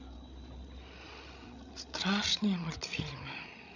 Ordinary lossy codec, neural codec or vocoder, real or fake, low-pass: none; codec, 16 kHz, 16 kbps, FreqCodec, larger model; fake; 7.2 kHz